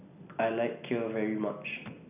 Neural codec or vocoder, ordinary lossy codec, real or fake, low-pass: none; none; real; 3.6 kHz